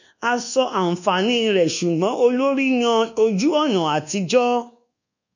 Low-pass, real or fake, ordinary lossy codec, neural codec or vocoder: 7.2 kHz; fake; none; codec, 24 kHz, 1.2 kbps, DualCodec